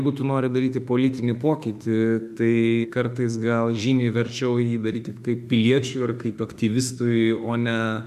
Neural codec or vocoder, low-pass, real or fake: autoencoder, 48 kHz, 32 numbers a frame, DAC-VAE, trained on Japanese speech; 14.4 kHz; fake